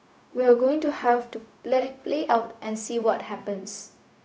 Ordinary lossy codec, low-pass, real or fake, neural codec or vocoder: none; none; fake; codec, 16 kHz, 0.4 kbps, LongCat-Audio-Codec